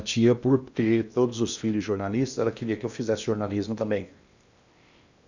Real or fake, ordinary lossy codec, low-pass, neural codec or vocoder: fake; none; 7.2 kHz; codec, 16 kHz in and 24 kHz out, 0.8 kbps, FocalCodec, streaming, 65536 codes